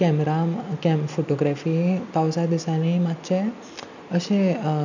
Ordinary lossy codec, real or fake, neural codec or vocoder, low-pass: none; real; none; 7.2 kHz